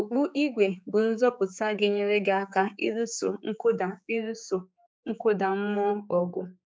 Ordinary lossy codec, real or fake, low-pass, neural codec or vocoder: none; fake; none; codec, 16 kHz, 4 kbps, X-Codec, HuBERT features, trained on general audio